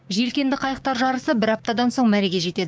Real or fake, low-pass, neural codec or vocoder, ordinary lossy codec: fake; none; codec, 16 kHz, 6 kbps, DAC; none